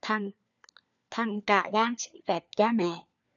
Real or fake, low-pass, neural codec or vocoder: fake; 7.2 kHz; codec, 16 kHz, 4 kbps, FunCodec, trained on LibriTTS, 50 frames a second